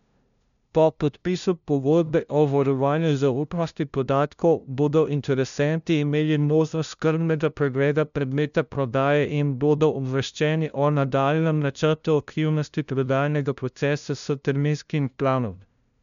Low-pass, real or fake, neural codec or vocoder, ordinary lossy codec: 7.2 kHz; fake; codec, 16 kHz, 0.5 kbps, FunCodec, trained on LibriTTS, 25 frames a second; none